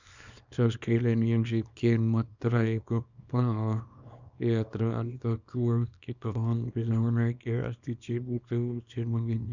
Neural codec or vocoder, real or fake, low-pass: codec, 24 kHz, 0.9 kbps, WavTokenizer, small release; fake; 7.2 kHz